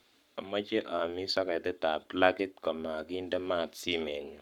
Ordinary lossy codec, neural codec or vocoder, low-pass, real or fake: none; codec, 44.1 kHz, 7.8 kbps, Pupu-Codec; 19.8 kHz; fake